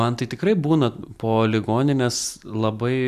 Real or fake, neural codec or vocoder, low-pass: real; none; 14.4 kHz